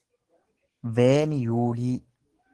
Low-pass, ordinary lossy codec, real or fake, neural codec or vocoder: 10.8 kHz; Opus, 16 kbps; fake; codec, 44.1 kHz, 7.8 kbps, DAC